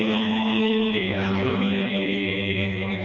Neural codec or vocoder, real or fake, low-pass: codec, 16 kHz, 2 kbps, FreqCodec, smaller model; fake; 7.2 kHz